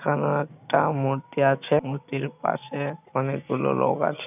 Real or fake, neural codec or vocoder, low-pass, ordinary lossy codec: real; none; 3.6 kHz; none